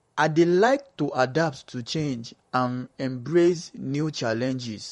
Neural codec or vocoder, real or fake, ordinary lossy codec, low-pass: vocoder, 44.1 kHz, 128 mel bands, Pupu-Vocoder; fake; MP3, 48 kbps; 19.8 kHz